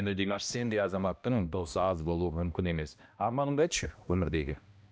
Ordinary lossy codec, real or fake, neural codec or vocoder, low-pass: none; fake; codec, 16 kHz, 1 kbps, X-Codec, HuBERT features, trained on balanced general audio; none